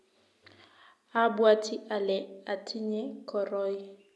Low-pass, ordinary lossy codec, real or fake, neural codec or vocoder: none; none; real; none